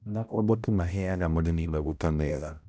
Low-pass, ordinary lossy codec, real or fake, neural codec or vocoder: none; none; fake; codec, 16 kHz, 0.5 kbps, X-Codec, HuBERT features, trained on balanced general audio